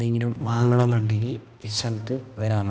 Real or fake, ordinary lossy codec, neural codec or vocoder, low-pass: fake; none; codec, 16 kHz, 1 kbps, X-Codec, HuBERT features, trained on balanced general audio; none